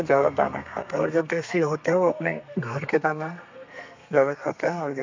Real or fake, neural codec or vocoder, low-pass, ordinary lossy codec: fake; codec, 32 kHz, 1.9 kbps, SNAC; 7.2 kHz; MP3, 64 kbps